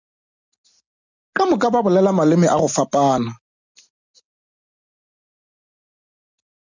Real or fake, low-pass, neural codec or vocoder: real; 7.2 kHz; none